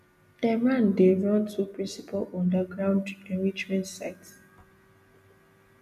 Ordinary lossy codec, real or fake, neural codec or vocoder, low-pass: none; real; none; 14.4 kHz